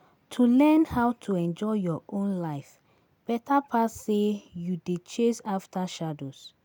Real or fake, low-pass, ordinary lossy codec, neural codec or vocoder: real; none; none; none